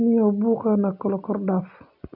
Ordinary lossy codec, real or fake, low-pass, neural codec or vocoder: none; real; 5.4 kHz; none